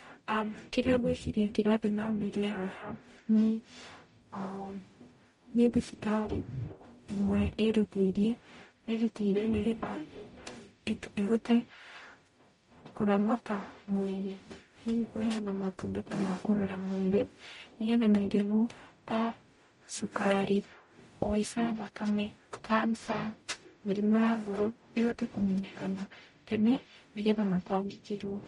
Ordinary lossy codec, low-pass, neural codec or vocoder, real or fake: MP3, 48 kbps; 19.8 kHz; codec, 44.1 kHz, 0.9 kbps, DAC; fake